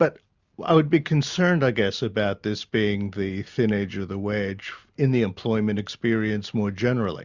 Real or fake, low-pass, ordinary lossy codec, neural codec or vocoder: real; 7.2 kHz; Opus, 64 kbps; none